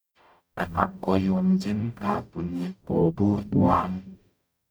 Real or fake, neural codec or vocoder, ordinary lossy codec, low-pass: fake; codec, 44.1 kHz, 0.9 kbps, DAC; none; none